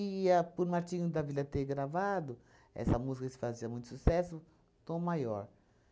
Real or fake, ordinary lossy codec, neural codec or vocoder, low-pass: real; none; none; none